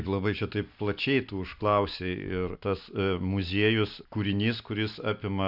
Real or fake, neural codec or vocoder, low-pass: real; none; 5.4 kHz